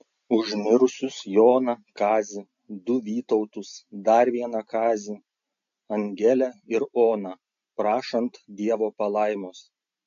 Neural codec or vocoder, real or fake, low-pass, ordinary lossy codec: none; real; 7.2 kHz; AAC, 64 kbps